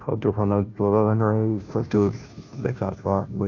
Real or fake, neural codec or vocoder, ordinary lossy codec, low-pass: fake; codec, 16 kHz, 0.5 kbps, FunCodec, trained on Chinese and English, 25 frames a second; none; 7.2 kHz